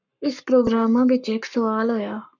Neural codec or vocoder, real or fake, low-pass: codec, 44.1 kHz, 7.8 kbps, Pupu-Codec; fake; 7.2 kHz